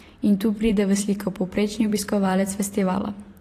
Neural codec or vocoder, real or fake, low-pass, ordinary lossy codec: vocoder, 44.1 kHz, 128 mel bands every 512 samples, BigVGAN v2; fake; 14.4 kHz; AAC, 48 kbps